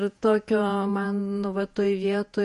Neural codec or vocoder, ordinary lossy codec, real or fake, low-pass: vocoder, 48 kHz, 128 mel bands, Vocos; MP3, 48 kbps; fake; 14.4 kHz